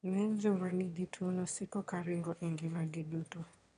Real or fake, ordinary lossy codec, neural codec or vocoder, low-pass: fake; none; autoencoder, 22.05 kHz, a latent of 192 numbers a frame, VITS, trained on one speaker; 9.9 kHz